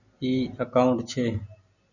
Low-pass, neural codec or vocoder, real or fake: 7.2 kHz; none; real